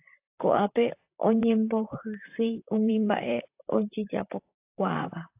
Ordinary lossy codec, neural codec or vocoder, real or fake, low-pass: AAC, 32 kbps; vocoder, 44.1 kHz, 128 mel bands, Pupu-Vocoder; fake; 3.6 kHz